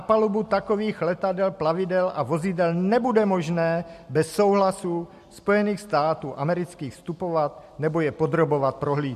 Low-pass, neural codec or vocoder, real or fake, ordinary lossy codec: 14.4 kHz; none; real; MP3, 64 kbps